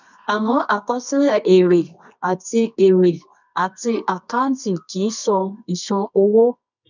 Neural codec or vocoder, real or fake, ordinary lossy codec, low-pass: codec, 24 kHz, 0.9 kbps, WavTokenizer, medium music audio release; fake; none; 7.2 kHz